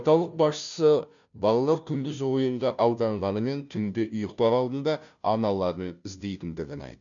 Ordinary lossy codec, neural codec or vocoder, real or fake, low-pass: none; codec, 16 kHz, 0.5 kbps, FunCodec, trained on Chinese and English, 25 frames a second; fake; 7.2 kHz